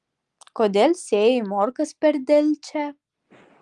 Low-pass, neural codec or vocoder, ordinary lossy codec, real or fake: 10.8 kHz; none; Opus, 32 kbps; real